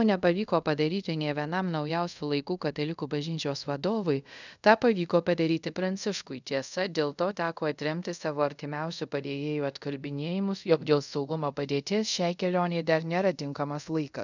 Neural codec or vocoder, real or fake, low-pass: codec, 24 kHz, 0.5 kbps, DualCodec; fake; 7.2 kHz